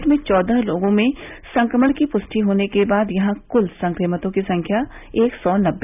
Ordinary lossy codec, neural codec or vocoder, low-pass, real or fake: none; none; 3.6 kHz; real